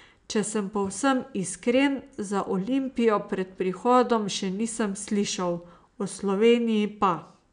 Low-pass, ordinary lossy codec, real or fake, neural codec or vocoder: 9.9 kHz; none; real; none